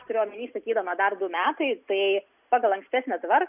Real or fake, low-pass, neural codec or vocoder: real; 3.6 kHz; none